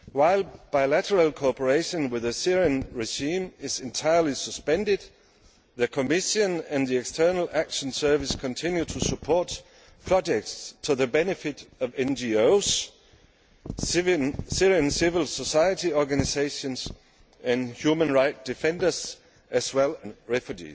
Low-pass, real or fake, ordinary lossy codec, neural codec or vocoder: none; real; none; none